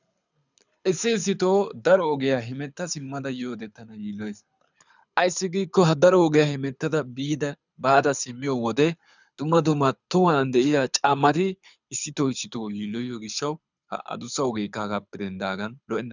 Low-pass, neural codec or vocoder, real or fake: 7.2 kHz; codec, 24 kHz, 6 kbps, HILCodec; fake